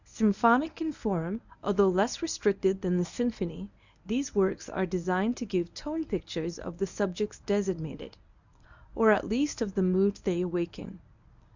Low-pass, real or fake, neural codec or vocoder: 7.2 kHz; fake; codec, 24 kHz, 0.9 kbps, WavTokenizer, medium speech release version 1